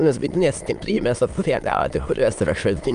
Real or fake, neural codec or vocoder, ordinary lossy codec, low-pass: fake; autoencoder, 22.05 kHz, a latent of 192 numbers a frame, VITS, trained on many speakers; Opus, 32 kbps; 9.9 kHz